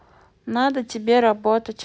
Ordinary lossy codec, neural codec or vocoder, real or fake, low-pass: none; none; real; none